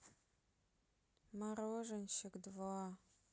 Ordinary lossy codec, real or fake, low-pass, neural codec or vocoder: none; real; none; none